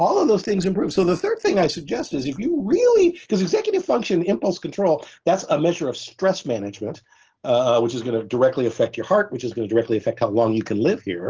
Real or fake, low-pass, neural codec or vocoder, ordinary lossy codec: real; 7.2 kHz; none; Opus, 16 kbps